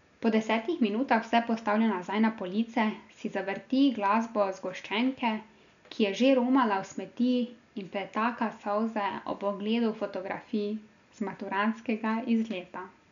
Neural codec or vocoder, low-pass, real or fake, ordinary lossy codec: none; 7.2 kHz; real; none